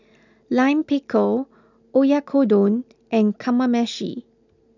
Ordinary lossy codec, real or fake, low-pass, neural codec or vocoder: none; real; 7.2 kHz; none